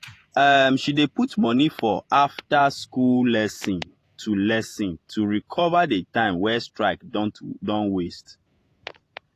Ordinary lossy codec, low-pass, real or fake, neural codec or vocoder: AAC, 48 kbps; 14.4 kHz; fake; vocoder, 48 kHz, 128 mel bands, Vocos